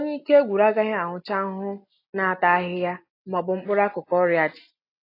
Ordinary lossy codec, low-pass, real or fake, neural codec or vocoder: AAC, 32 kbps; 5.4 kHz; real; none